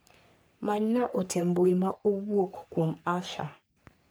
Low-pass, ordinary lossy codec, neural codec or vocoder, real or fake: none; none; codec, 44.1 kHz, 3.4 kbps, Pupu-Codec; fake